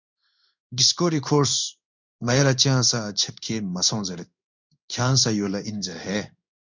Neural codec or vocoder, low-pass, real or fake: codec, 16 kHz in and 24 kHz out, 1 kbps, XY-Tokenizer; 7.2 kHz; fake